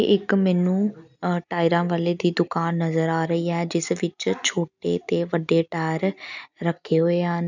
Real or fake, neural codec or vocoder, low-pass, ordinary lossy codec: real; none; 7.2 kHz; none